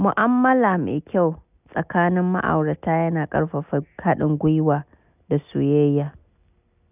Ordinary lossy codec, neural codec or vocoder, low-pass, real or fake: none; none; 3.6 kHz; real